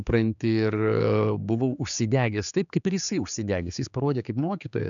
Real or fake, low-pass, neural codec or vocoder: fake; 7.2 kHz; codec, 16 kHz, 4 kbps, X-Codec, HuBERT features, trained on general audio